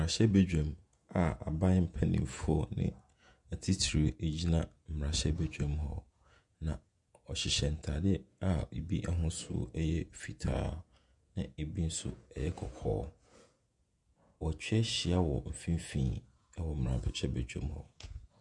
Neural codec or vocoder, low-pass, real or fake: vocoder, 48 kHz, 128 mel bands, Vocos; 10.8 kHz; fake